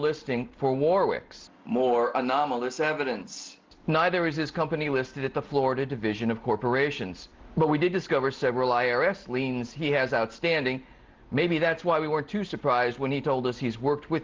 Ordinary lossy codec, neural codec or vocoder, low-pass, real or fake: Opus, 24 kbps; none; 7.2 kHz; real